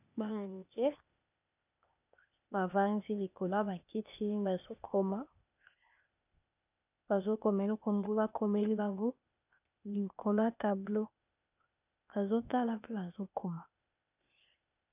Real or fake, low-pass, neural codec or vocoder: fake; 3.6 kHz; codec, 16 kHz, 0.8 kbps, ZipCodec